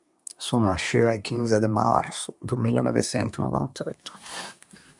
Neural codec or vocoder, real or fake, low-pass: codec, 24 kHz, 1 kbps, SNAC; fake; 10.8 kHz